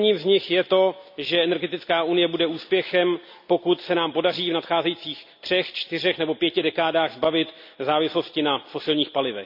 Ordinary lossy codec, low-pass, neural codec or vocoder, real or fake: MP3, 48 kbps; 5.4 kHz; none; real